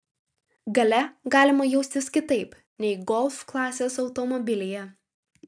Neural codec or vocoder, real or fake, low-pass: none; real; 9.9 kHz